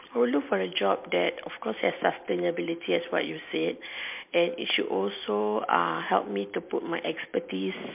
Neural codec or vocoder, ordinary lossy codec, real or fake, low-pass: none; MP3, 32 kbps; real; 3.6 kHz